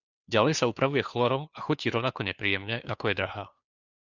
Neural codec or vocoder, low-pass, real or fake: codec, 16 kHz, 2 kbps, FunCodec, trained on Chinese and English, 25 frames a second; 7.2 kHz; fake